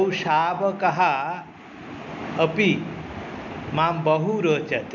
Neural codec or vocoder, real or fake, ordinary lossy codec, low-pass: none; real; none; 7.2 kHz